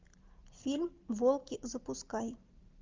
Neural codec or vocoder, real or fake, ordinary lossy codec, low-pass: none; real; Opus, 24 kbps; 7.2 kHz